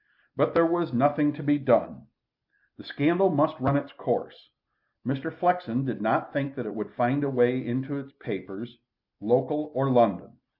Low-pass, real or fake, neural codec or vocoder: 5.4 kHz; real; none